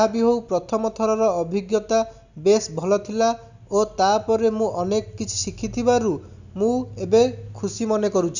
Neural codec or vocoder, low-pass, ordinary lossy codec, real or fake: none; 7.2 kHz; none; real